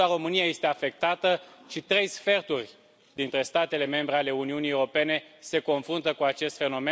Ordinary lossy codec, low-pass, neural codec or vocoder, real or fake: none; none; none; real